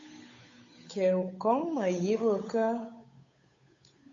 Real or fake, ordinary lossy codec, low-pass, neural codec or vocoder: fake; AAC, 48 kbps; 7.2 kHz; codec, 16 kHz, 8 kbps, FunCodec, trained on Chinese and English, 25 frames a second